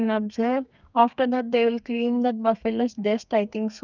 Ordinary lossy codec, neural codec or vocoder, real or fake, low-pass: none; codec, 32 kHz, 1.9 kbps, SNAC; fake; 7.2 kHz